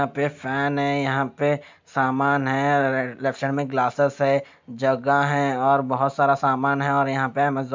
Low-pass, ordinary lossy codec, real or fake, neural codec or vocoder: 7.2 kHz; MP3, 64 kbps; real; none